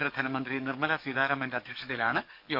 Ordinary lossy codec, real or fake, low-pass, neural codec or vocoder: none; fake; 5.4 kHz; codec, 44.1 kHz, 7.8 kbps, DAC